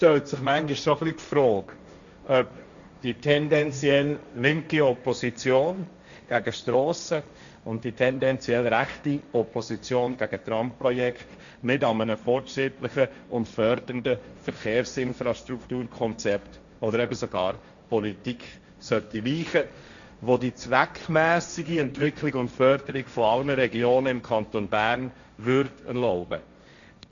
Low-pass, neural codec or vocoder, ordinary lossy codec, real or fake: 7.2 kHz; codec, 16 kHz, 1.1 kbps, Voila-Tokenizer; none; fake